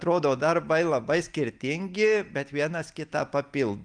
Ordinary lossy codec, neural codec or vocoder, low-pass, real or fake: Opus, 32 kbps; none; 9.9 kHz; real